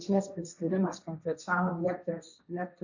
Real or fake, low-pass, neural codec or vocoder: fake; 7.2 kHz; codec, 16 kHz, 1.1 kbps, Voila-Tokenizer